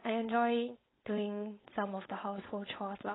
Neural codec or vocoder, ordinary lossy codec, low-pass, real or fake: codec, 16 kHz, 4.8 kbps, FACodec; AAC, 16 kbps; 7.2 kHz; fake